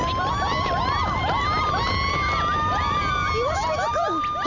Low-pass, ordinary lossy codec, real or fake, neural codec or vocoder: 7.2 kHz; none; real; none